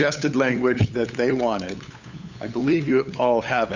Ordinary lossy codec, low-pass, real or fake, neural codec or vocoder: Opus, 64 kbps; 7.2 kHz; fake; codec, 16 kHz, 8 kbps, FunCodec, trained on LibriTTS, 25 frames a second